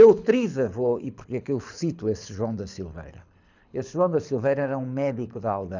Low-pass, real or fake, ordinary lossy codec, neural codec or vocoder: 7.2 kHz; fake; none; codec, 24 kHz, 6 kbps, HILCodec